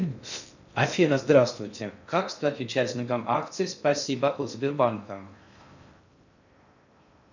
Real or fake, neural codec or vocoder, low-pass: fake; codec, 16 kHz in and 24 kHz out, 0.6 kbps, FocalCodec, streaming, 2048 codes; 7.2 kHz